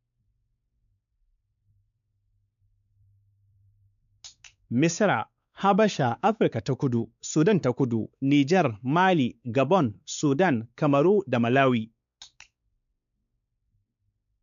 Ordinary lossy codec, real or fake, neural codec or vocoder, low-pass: none; fake; codec, 16 kHz, 4 kbps, X-Codec, WavLM features, trained on Multilingual LibriSpeech; 7.2 kHz